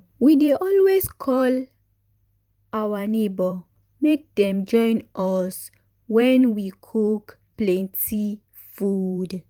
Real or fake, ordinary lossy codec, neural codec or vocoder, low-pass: fake; Opus, 32 kbps; vocoder, 44.1 kHz, 128 mel bands every 512 samples, BigVGAN v2; 19.8 kHz